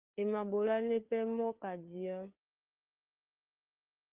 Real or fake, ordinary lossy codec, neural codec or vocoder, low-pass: fake; Opus, 32 kbps; codec, 16 kHz, 4 kbps, FreqCodec, larger model; 3.6 kHz